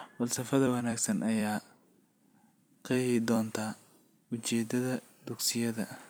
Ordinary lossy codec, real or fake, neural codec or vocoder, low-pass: none; fake; vocoder, 44.1 kHz, 128 mel bands every 256 samples, BigVGAN v2; none